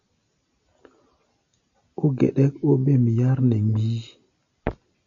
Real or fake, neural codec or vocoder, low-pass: real; none; 7.2 kHz